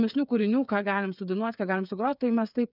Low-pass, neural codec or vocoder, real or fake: 5.4 kHz; codec, 16 kHz, 8 kbps, FreqCodec, smaller model; fake